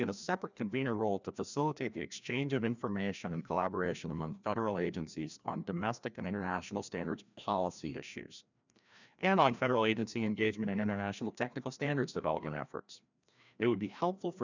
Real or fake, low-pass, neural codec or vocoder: fake; 7.2 kHz; codec, 16 kHz, 1 kbps, FreqCodec, larger model